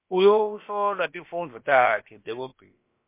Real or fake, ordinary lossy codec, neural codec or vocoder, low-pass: fake; MP3, 24 kbps; codec, 16 kHz, about 1 kbps, DyCAST, with the encoder's durations; 3.6 kHz